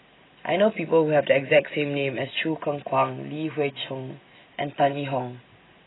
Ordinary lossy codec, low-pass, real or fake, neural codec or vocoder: AAC, 16 kbps; 7.2 kHz; real; none